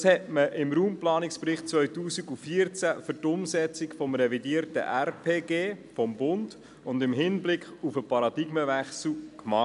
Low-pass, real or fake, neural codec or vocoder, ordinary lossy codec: 10.8 kHz; real; none; none